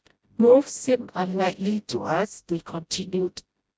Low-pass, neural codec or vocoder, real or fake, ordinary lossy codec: none; codec, 16 kHz, 0.5 kbps, FreqCodec, smaller model; fake; none